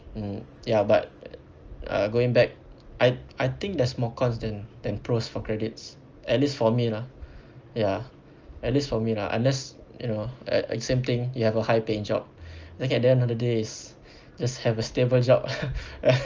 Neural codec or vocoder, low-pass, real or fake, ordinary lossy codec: none; 7.2 kHz; real; Opus, 24 kbps